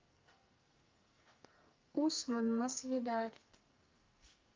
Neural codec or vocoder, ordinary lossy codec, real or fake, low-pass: codec, 44.1 kHz, 2.6 kbps, SNAC; Opus, 24 kbps; fake; 7.2 kHz